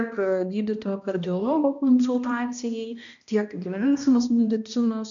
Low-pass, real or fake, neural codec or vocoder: 7.2 kHz; fake; codec, 16 kHz, 1 kbps, X-Codec, HuBERT features, trained on balanced general audio